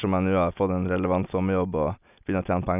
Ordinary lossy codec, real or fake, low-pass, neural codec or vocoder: none; real; 3.6 kHz; none